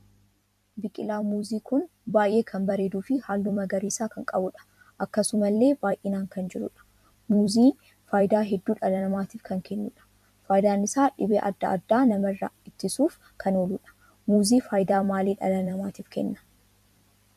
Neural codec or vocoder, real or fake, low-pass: vocoder, 44.1 kHz, 128 mel bands every 256 samples, BigVGAN v2; fake; 14.4 kHz